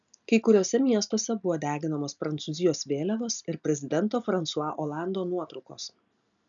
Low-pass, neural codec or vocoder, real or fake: 7.2 kHz; none; real